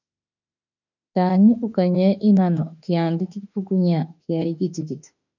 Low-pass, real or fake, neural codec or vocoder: 7.2 kHz; fake; autoencoder, 48 kHz, 32 numbers a frame, DAC-VAE, trained on Japanese speech